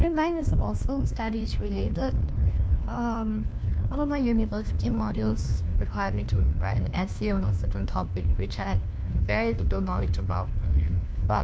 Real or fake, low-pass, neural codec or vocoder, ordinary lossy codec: fake; none; codec, 16 kHz, 1 kbps, FunCodec, trained on LibriTTS, 50 frames a second; none